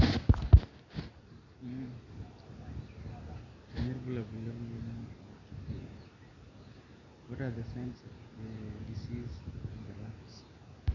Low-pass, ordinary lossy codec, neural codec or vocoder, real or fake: 7.2 kHz; none; none; real